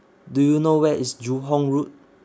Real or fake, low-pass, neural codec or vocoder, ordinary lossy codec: real; none; none; none